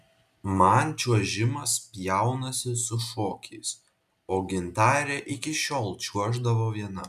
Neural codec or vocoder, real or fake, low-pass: none; real; 14.4 kHz